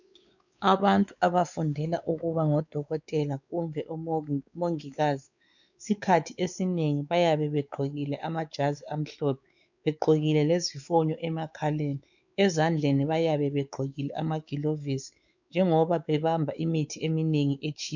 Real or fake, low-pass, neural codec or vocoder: fake; 7.2 kHz; codec, 16 kHz, 4 kbps, X-Codec, WavLM features, trained on Multilingual LibriSpeech